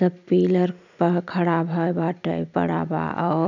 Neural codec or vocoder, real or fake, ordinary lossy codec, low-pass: none; real; none; 7.2 kHz